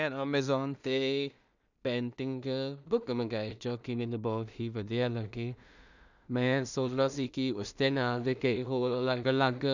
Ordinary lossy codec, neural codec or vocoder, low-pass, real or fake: none; codec, 16 kHz in and 24 kHz out, 0.4 kbps, LongCat-Audio-Codec, two codebook decoder; 7.2 kHz; fake